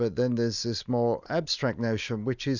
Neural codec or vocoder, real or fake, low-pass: none; real; 7.2 kHz